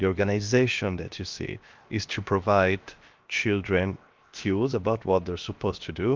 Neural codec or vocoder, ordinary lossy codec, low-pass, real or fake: codec, 16 kHz, 0.7 kbps, FocalCodec; Opus, 24 kbps; 7.2 kHz; fake